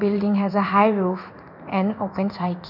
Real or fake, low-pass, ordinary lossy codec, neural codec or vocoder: fake; 5.4 kHz; none; codec, 16 kHz in and 24 kHz out, 1 kbps, XY-Tokenizer